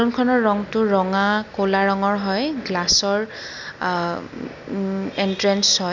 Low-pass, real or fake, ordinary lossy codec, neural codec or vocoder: 7.2 kHz; real; none; none